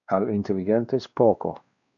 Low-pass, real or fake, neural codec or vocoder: 7.2 kHz; fake; codec, 16 kHz, 2 kbps, X-Codec, WavLM features, trained on Multilingual LibriSpeech